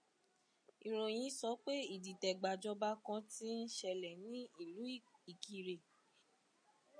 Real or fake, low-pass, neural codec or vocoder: real; 9.9 kHz; none